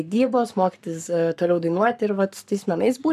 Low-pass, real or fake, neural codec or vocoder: 14.4 kHz; fake; codec, 44.1 kHz, 7.8 kbps, Pupu-Codec